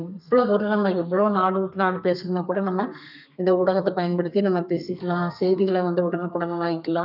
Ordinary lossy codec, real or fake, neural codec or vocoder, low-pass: none; fake; codec, 32 kHz, 1.9 kbps, SNAC; 5.4 kHz